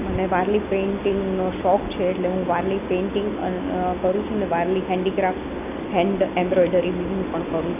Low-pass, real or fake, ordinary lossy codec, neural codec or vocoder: 3.6 kHz; real; none; none